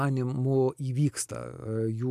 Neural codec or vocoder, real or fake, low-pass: none; real; 14.4 kHz